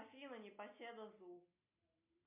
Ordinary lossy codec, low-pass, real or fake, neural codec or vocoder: MP3, 32 kbps; 3.6 kHz; real; none